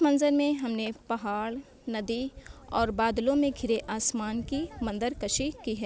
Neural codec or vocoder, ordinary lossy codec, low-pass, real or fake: none; none; none; real